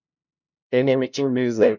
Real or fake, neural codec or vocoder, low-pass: fake; codec, 16 kHz, 0.5 kbps, FunCodec, trained on LibriTTS, 25 frames a second; 7.2 kHz